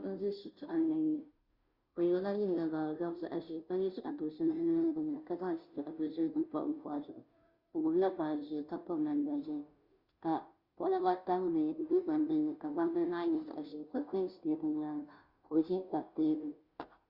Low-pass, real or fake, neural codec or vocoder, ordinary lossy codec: 5.4 kHz; fake; codec, 16 kHz, 0.5 kbps, FunCodec, trained on Chinese and English, 25 frames a second; Opus, 64 kbps